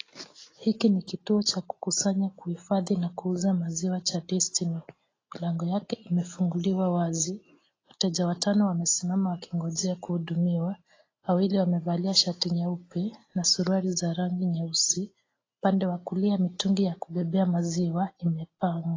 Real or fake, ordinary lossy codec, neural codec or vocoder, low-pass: real; AAC, 32 kbps; none; 7.2 kHz